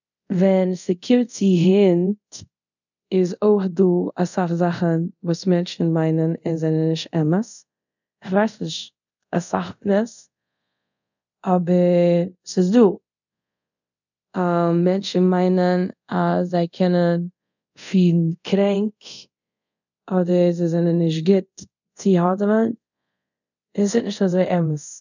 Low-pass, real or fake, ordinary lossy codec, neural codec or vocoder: 7.2 kHz; fake; none; codec, 24 kHz, 0.5 kbps, DualCodec